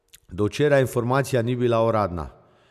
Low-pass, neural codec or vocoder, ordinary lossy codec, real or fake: 14.4 kHz; none; none; real